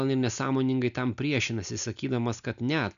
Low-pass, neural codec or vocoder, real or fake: 7.2 kHz; none; real